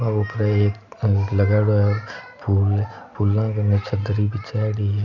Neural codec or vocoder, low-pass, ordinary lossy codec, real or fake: none; 7.2 kHz; none; real